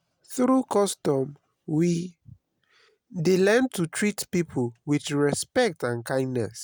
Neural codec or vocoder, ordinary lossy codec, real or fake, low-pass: none; none; real; none